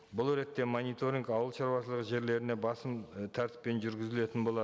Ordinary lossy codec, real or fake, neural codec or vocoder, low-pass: none; real; none; none